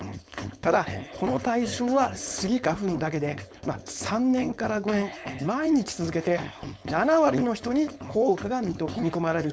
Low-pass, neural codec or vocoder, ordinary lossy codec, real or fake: none; codec, 16 kHz, 4.8 kbps, FACodec; none; fake